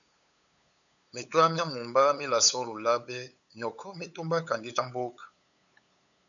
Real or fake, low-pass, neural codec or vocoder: fake; 7.2 kHz; codec, 16 kHz, 8 kbps, FunCodec, trained on LibriTTS, 25 frames a second